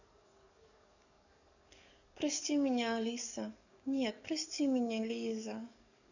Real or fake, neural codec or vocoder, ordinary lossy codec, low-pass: fake; codec, 44.1 kHz, 7.8 kbps, DAC; none; 7.2 kHz